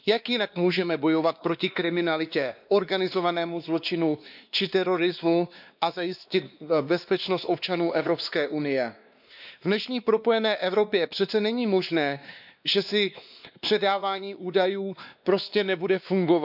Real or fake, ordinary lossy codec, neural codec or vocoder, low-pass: fake; none; codec, 16 kHz, 2 kbps, X-Codec, WavLM features, trained on Multilingual LibriSpeech; 5.4 kHz